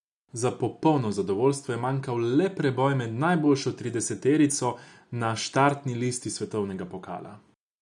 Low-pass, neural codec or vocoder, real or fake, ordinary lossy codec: 10.8 kHz; none; real; none